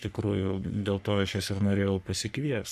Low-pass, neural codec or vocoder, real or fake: 14.4 kHz; codec, 44.1 kHz, 3.4 kbps, Pupu-Codec; fake